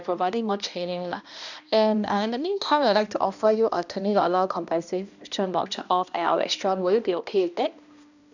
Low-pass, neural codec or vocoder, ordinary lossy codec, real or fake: 7.2 kHz; codec, 16 kHz, 1 kbps, X-Codec, HuBERT features, trained on balanced general audio; none; fake